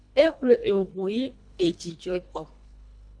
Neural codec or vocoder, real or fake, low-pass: codec, 24 kHz, 1.5 kbps, HILCodec; fake; 9.9 kHz